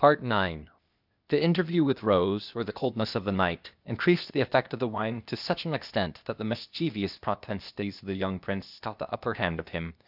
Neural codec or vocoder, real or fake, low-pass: codec, 16 kHz, 0.8 kbps, ZipCodec; fake; 5.4 kHz